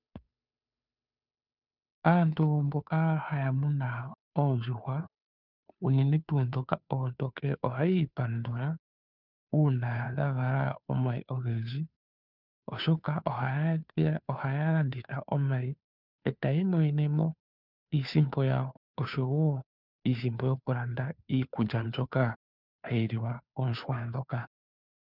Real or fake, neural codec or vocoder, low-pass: fake; codec, 16 kHz, 2 kbps, FunCodec, trained on Chinese and English, 25 frames a second; 5.4 kHz